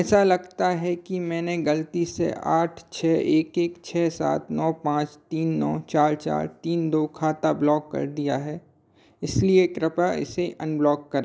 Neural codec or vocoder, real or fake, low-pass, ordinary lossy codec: none; real; none; none